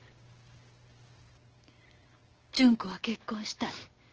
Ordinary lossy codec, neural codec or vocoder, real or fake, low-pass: Opus, 16 kbps; codec, 16 kHz, 6 kbps, DAC; fake; 7.2 kHz